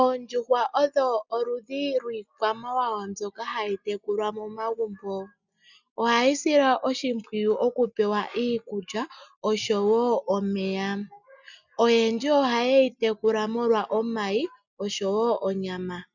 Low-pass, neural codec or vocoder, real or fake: 7.2 kHz; none; real